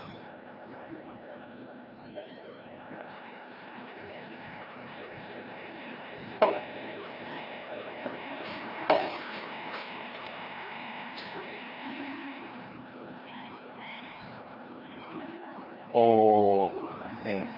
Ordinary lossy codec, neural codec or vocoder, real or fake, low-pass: none; codec, 16 kHz, 1 kbps, FreqCodec, larger model; fake; 5.4 kHz